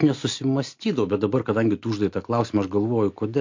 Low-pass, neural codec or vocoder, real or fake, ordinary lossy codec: 7.2 kHz; none; real; MP3, 48 kbps